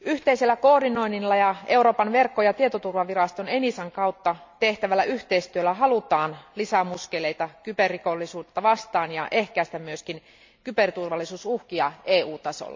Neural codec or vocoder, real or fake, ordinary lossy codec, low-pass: none; real; MP3, 64 kbps; 7.2 kHz